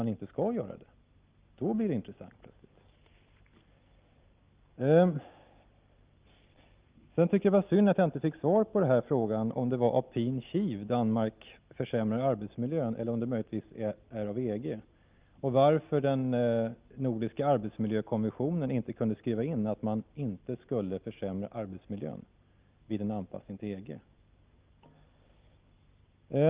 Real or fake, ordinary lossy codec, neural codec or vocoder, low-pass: real; Opus, 24 kbps; none; 3.6 kHz